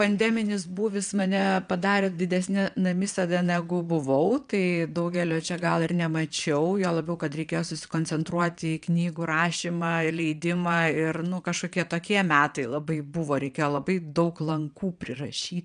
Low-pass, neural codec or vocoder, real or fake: 9.9 kHz; vocoder, 22.05 kHz, 80 mel bands, Vocos; fake